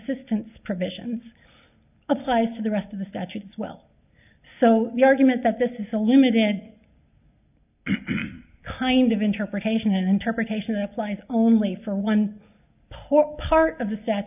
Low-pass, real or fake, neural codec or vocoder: 3.6 kHz; real; none